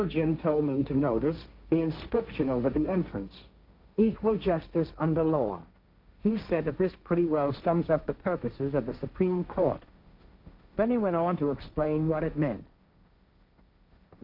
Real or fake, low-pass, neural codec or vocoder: fake; 5.4 kHz; codec, 16 kHz, 1.1 kbps, Voila-Tokenizer